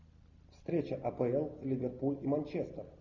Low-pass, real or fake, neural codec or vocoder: 7.2 kHz; real; none